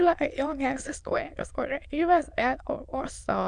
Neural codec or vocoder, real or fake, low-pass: autoencoder, 22.05 kHz, a latent of 192 numbers a frame, VITS, trained on many speakers; fake; 9.9 kHz